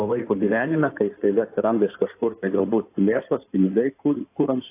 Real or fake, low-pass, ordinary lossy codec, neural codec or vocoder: fake; 3.6 kHz; AAC, 24 kbps; codec, 16 kHz in and 24 kHz out, 2.2 kbps, FireRedTTS-2 codec